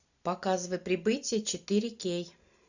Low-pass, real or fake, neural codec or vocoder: 7.2 kHz; real; none